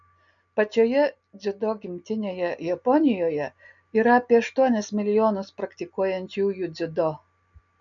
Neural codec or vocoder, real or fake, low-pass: none; real; 7.2 kHz